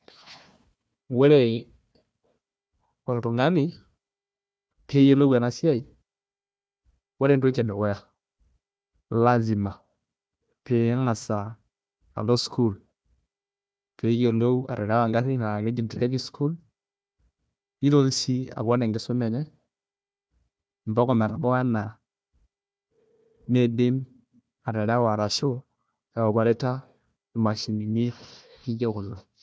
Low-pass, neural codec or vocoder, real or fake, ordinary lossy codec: none; codec, 16 kHz, 1 kbps, FunCodec, trained on Chinese and English, 50 frames a second; fake; none